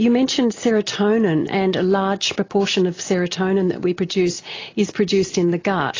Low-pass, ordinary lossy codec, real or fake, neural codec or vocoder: 7.2 kHz; AAC, 32 kbps; real; none